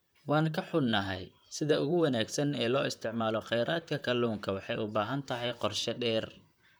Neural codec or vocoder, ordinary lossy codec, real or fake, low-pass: vocoder, 44.1 kHz, 128 mel bands, Pupu-Vocoder; none; fake; none